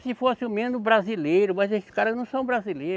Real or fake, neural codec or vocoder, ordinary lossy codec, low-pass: real; none; none; none